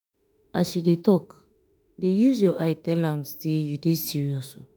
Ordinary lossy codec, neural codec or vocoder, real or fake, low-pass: none; autoencoder, 48 kHz, 32 numbers a frame, DAC-VAE, trained on Japanese speech; fake; none